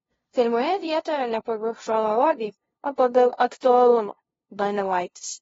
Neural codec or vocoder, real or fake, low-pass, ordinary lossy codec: codec, 16 kHz, 0.5 kbps, FunCodec, trained on LibriTTS, 25 frames a second; fake; 7.2 kHz; AAC, 24 kbps